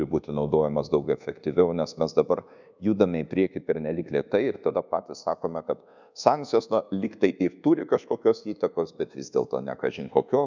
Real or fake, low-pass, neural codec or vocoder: fake; 7.2 kHz; codec, 24 kHz, 1.2 kbps, DualCodec